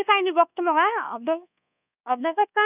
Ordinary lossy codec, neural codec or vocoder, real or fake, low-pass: none; codec, 24 kHz, 1.2 kbps, DualCodec; fake; 3.6 kHz